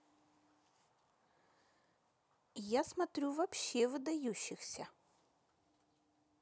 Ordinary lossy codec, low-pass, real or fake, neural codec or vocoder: none; none; real; none